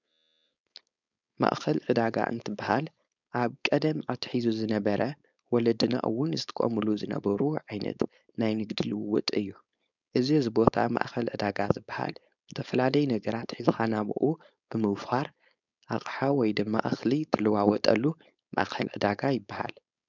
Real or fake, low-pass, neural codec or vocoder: fake; 7.2 kHz; codec, 16 kHz, 4.8 kbps, FACodec